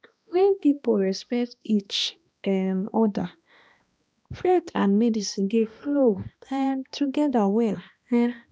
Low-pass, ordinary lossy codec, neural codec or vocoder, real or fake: none; none; codec, 16 kHz, 1 kbps, X-Codec, HuBERT features, trained on balanced general audio; fake